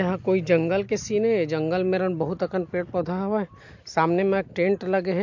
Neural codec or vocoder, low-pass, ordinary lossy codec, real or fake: none; 7.2 kHz; MP3, 48 kbps; real